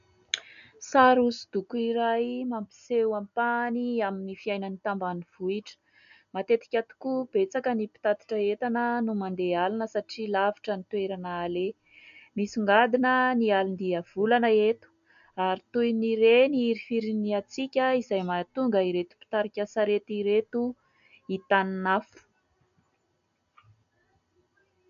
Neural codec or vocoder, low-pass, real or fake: none; 7.2 kHz; real